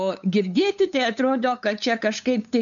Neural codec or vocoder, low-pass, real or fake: codec, 16 kHz, 8 kbps, FunCodec, trained on LibriTTS, 25 frames a second; 7.2 kHz; fake